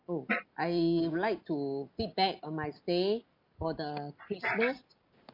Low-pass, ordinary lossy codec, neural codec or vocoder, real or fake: 5.4 kHz; MP3, 48 kbps; none; real